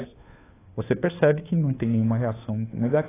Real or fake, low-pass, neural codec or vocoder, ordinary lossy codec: fake; 3.6 kHz; codec, 16 kHz, 2 kbps, FunCodec, trained on Chinese and English, 25 frames a second; AAC, 16 kbps